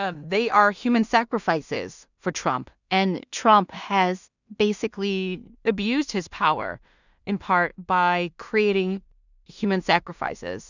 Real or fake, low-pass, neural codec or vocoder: fake; 7.2 kHz; codec, 16 kHz in and 24 kHz out, 0.4 kbps, LongCat-Audio-Codec, two codebook decoder